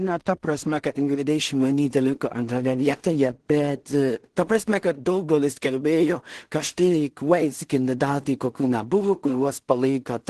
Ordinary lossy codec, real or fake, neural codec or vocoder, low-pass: Opus, 16 kbps; fake; codec, 16 kHz in and 24 kHz out, 0.4 kbps, LongCat-Audio-Codec, two codebook decoder; 10.8 kHz